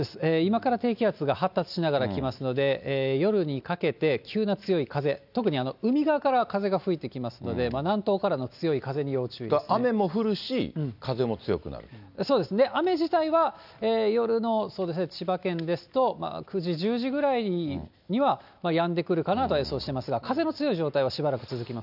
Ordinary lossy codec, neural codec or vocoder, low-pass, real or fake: none; none; 5.4 kHz; real